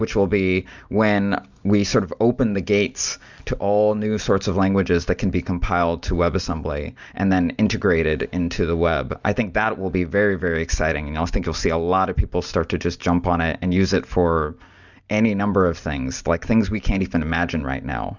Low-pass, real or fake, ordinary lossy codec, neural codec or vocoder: 7.2 kHz; real; Opus, 64 kbps; none